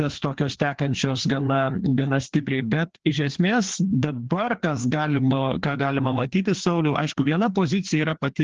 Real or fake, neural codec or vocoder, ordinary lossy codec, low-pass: fake; codec, 16 kHz, 2 kbps, FreqCodec, larger model; Opus, 16 kbps; 7.2 kHz